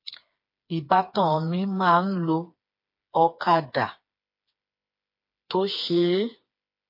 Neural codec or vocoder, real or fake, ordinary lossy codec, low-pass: codec, 16 kHz, 4 kbps, FreqCodec, smaller model; fake; MP3, 32 kbps; 5.4 kHz